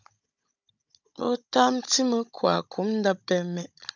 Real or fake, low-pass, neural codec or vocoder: fake; 7.2 kHz; codec, 16 kHz, 16 kbps, FunCodec, trained on Chinese and English, 50 frames a second